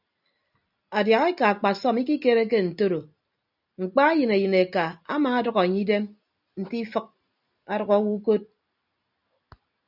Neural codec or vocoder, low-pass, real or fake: none; 5.4 kHz; real